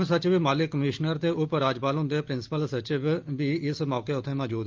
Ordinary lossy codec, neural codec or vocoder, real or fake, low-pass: Opus, 24 kbps; none; real; 7.2 kHz